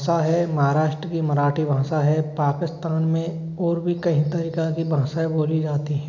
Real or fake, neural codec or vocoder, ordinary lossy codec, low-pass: real; none; none; 7.2 kHz